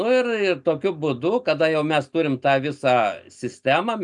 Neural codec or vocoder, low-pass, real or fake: none; 10.8 kHz; real